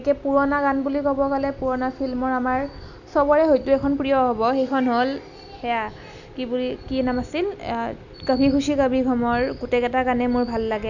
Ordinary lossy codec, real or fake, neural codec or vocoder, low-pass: none; real; none; 7.2 kHz